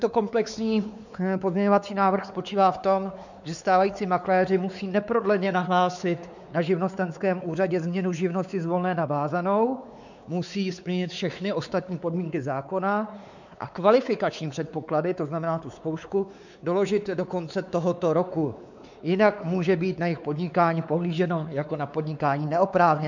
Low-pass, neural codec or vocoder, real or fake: 7.2 kHz; codec, 16 kHz, 4 kbps, X-Codec, WavLM features, trained on Multilingual LibriSpeech; fake